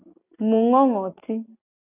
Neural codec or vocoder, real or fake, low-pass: none; real; 3.6 kHz